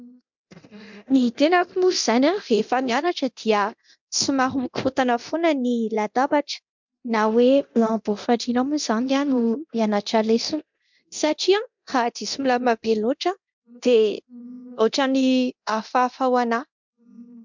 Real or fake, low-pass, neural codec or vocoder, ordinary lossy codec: fake; 7.2 kHz; codec, 24 kHz, 0.9 kbps, DualCodec; MP3, 64 kbps